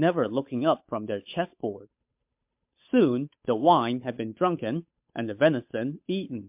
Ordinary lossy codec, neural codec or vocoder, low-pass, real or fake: MP3, 32 kbps; codec, 16 kHz, 8 kbps, FunCodec, trained on Chinese and English, 25 frames a second; 3.6 kHz; fake